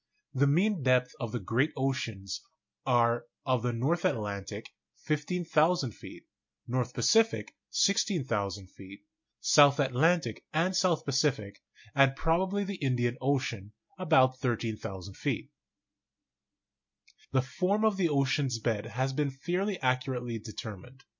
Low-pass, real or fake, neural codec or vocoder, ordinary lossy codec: 7.2 kHz; real; none; MP3, 48 kbps